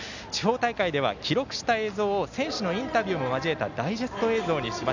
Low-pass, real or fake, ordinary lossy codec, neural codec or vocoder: 7.2 kHz; real; none; none